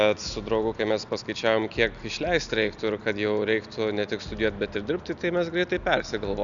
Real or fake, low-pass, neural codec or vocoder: real; 7.2 kHz; none